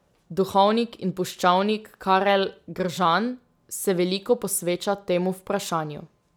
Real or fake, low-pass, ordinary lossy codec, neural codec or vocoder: real; none; none; none